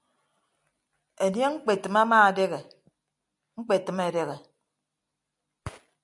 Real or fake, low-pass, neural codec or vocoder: real; 10.8 kHz; none